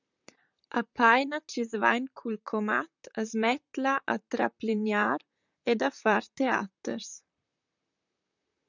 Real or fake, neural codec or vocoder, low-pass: fake; vocoder, 44.1 kHz, 128 mel bands, Pupu-Vocoder; 7.2 kHz